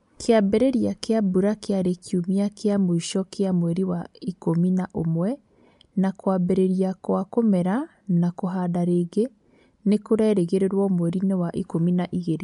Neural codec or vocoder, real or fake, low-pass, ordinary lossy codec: none; real; 10.8 kHz; MP3, 64 kbps